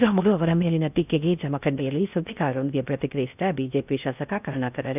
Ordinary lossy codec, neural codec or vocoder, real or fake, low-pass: none; codec, 16 kHz in and 24 kHz out, 0.6 kbps, FocalCodec, streaming, 4096 codes; fake; 3.6 kHz